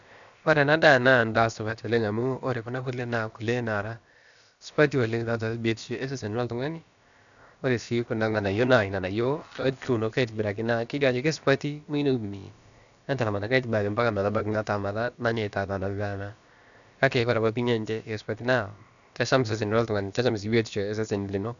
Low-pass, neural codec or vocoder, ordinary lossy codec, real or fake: 7.2 kHz; codec, 16 kHz, about 1 kbps, DyCAST, with the encoder's durations; none; fake